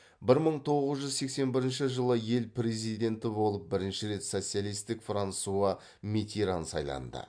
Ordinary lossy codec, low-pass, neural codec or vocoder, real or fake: MP3, 64 kbps; 9.9 kHz; none; real